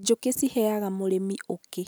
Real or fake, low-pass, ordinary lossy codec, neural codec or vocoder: real; none; none; none